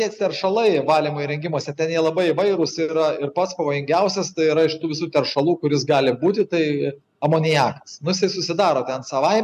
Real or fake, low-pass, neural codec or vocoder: real; 14.4 kHz; none